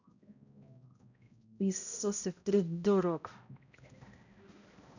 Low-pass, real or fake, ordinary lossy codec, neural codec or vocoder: 7.2 kHz; fake; none; codec, 16 kHz, 0.5 kbps, X-Codec, HuBERT features, trained on balanced general audio